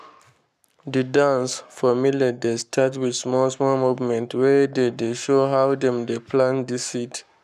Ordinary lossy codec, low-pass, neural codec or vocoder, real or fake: none; 14.4 kHz; codec, 44.1 kHz, 7.8 kbps, Pupu-Codec; fake